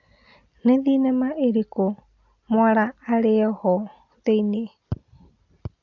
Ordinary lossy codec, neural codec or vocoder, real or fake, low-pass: AAC, 48 kbps; none; real; 7.2 kHz